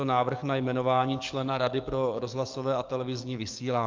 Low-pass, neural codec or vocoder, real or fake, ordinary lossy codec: 7.2 kHz; codec, 44.1 kHz, 7.8 kbps, DAC; fake; Opus, 24 kbps